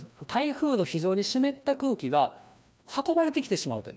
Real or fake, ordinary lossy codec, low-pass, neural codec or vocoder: fake; none; none; codec, 16 kHz, 1 kbps, FreqCodec, larger model